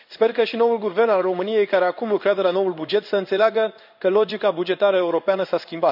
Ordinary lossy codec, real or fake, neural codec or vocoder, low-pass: none; fake; codec, 16 kHz in and 24 kHz out, 1 kbps, XY-Tokenizer; 5.4 kHz